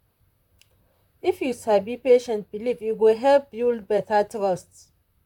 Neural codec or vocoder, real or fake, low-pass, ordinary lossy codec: vocoder, 44.1 kHz, 128 mel bands, Pupu-Vocoder; fake; 19.8 kHz; none